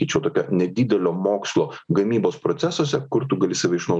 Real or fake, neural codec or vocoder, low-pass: real; none; 9.9 kHz